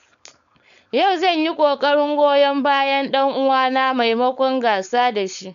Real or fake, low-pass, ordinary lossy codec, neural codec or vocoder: fake; 7.2 kHz; none; codec, 16 kHz, 4.8 kbps, FACodec